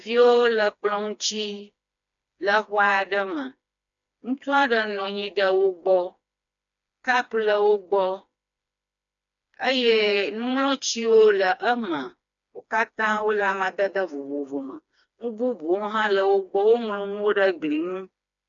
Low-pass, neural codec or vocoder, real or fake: 7.2 kHz; codec, 16 kHz, 2 kbps, FreqCodec, smaller model; fake